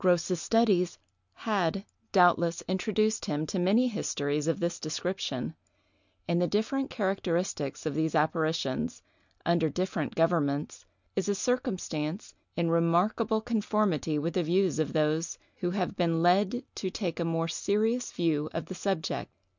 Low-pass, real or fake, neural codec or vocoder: 7.2 kHz; real; none